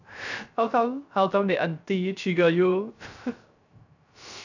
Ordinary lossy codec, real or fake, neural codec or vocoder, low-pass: none; fake; codec, 16 kHz, 0.3 kbps, FocalCodec; 7.2 kHz